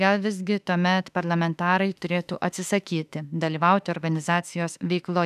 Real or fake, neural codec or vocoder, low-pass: fake; autoencoder, 48 kHz, 32 numbers a frame, DAC-VAE, trained on Japanese speech; 14.4 kHz